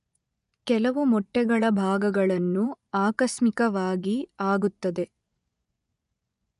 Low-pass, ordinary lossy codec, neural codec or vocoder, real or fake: 10.8 kHz; none; vocoder, 24 kHz, 100 mel bands, Vocos; fake